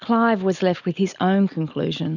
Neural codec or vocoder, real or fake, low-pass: none; real; 7.2 kHz